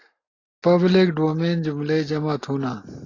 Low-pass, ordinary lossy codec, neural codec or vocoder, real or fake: 7.2 kHz; AAC, 32 kbps; none; real